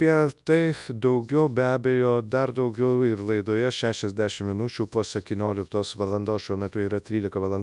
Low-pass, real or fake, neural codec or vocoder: 10.8 kHz; fake; codec, 24 kHz, 0.9 kbps, WavTokenizer, large speech release